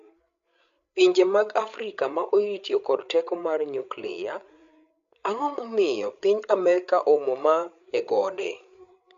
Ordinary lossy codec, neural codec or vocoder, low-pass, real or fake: MP3, 64 kbps; codec, 16 kHz, 8 kbps, FreqCodec, larger model; 7.2 kHz; fake